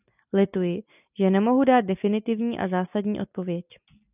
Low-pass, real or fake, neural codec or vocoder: 3.6 kHz; real; none